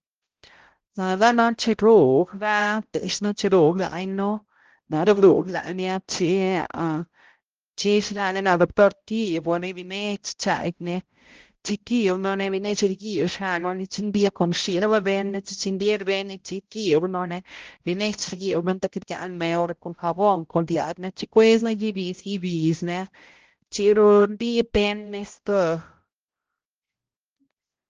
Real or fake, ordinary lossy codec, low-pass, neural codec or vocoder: fake; Opus, 16 kbps; 7.2 kHz; codec, 16 kHz, 0.5 kbps, X-Codec, HuBERT features, trained on balanced general audio